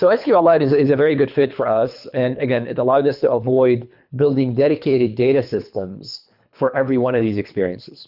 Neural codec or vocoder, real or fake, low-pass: codec, 24 kHz, 3 kbps, HILCodec; fake; 5.4 kHz